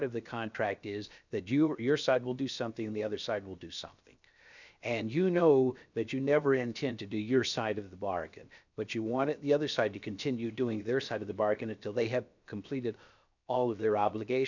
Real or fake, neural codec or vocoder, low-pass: fake; codec, 16 kHz, 0.7 kbps, FocalCodec; 7.2 kHz